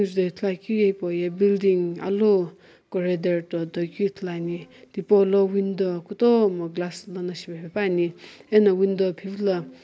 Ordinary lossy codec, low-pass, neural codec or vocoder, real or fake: none; none; none; real